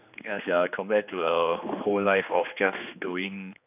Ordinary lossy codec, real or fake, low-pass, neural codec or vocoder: none; fake; 3.6 kHz; codec, 16 kHz, 2 kbps, X-Codec, HuBERT features, trained on general audio